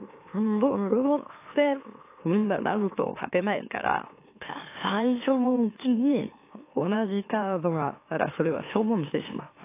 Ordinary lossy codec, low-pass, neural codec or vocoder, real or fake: AAC, 24 kbps; 3.6 kHz; autoencoder, 44.1 kHz, a latent of 192 numbers a frame, MeloTTS; fake